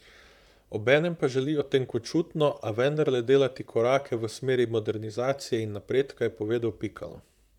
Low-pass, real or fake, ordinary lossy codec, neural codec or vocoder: 19.8 kHz; fake; none; vocoder, 44.1 kHz, 128 mel bands, Pupu-Vocoder